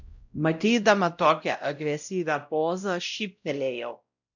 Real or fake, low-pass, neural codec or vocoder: fake; 7.2 kHz; codec, 16 kHz, 0.5 kbps, X-Codec, WavLM features, trained on Multilingual LibriSpeech